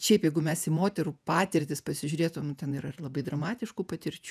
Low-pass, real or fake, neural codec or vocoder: 14.4 kHz; fake; vocoder, 48 kHz, 128 mel bands, Vocos